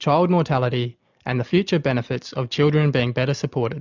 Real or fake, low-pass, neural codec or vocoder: real; 7.2 kHz; none